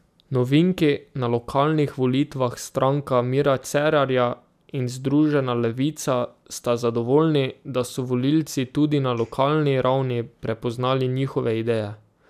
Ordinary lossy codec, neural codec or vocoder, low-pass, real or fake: none; none; 14.4 kHz; real